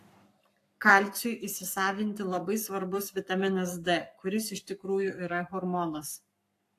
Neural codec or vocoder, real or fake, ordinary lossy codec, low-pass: codec, 44.1 kHz, 7.8 kbps, Pupu-Codec; fake; AAC, 64 kbps; 14.4 kHz